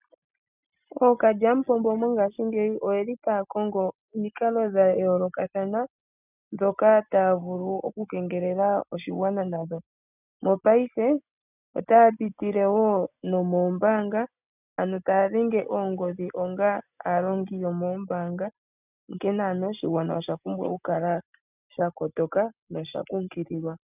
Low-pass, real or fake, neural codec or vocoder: 3.6 kHz; real; none